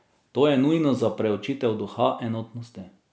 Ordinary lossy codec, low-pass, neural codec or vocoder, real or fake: none; none; none; real